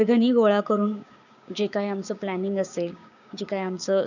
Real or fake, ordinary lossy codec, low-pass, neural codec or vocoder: fake; none; 7.2 kHz; codec, 16 kHz, 6 kbps, DAC